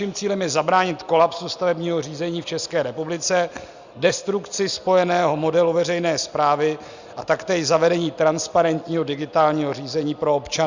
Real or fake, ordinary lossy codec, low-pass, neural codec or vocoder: real; Opus, 64 kbps; 7.2 kHz; none